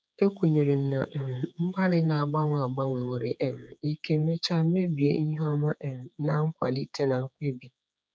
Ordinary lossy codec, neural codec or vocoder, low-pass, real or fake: none; codec, 16 kHz, 4 kbps, X-Codec, HuBERT features, trained on general audio; none; fake